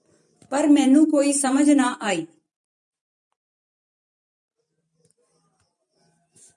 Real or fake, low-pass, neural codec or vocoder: fake; 10.8 kHz; vocoder, 44.1 kHz, 128 mel bands every 512 samples, BigVGAN v2